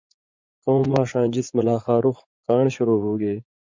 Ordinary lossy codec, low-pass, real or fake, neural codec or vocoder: MP3, 64 kbps; 7.2 kHz; fake; vocoder, 44.1 kHz, 80 mel bands, Vocos